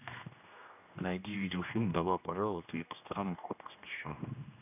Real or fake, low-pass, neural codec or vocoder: fake; 3.6 kHz; codec, 16 kHz, 1 kbps, X-Codec, HuBERT features, trained on general audio